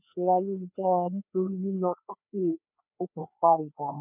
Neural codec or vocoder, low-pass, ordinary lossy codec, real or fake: codec, 16 kHz, 2 kbps, FreqCodec, larger model; 3.6 kHz; none; fake